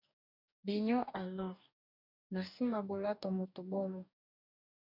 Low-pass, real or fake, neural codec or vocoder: 5.4 kHz; fake; codec, 44.1 kHz, 2.6 kbps, DAC